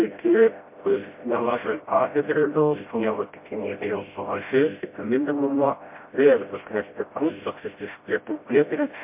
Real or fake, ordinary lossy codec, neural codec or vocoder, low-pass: fake; MP3, 24 kbps; codec, 16 kHz, 0.5 kbps, FreqCodec, smaller model; 3.6 kHz